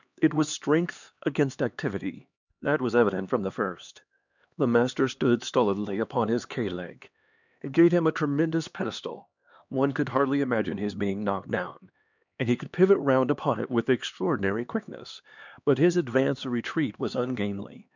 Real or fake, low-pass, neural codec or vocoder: fake; 7.2 kHz; codec, 16 kHz, 2 kbps, X-Codec, HuBERT features, trained on LibriSpeech